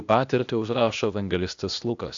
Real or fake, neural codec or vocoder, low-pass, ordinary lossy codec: fake; codec, 16 kHz, 0.8 kbps, ZipCodec; 7.2 kHz; AAC, 64 kbps